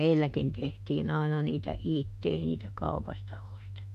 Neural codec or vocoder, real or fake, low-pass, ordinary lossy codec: autoencoder, 48 kHz, 32 numbers a frame, DAC-VAE, trained on Japanese speech; fake; 14.4 kHz; none